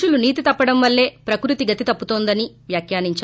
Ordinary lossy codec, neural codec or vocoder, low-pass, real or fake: none; none; none; real